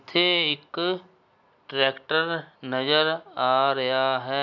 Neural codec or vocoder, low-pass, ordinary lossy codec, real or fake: none; 7.2 kHz; none; real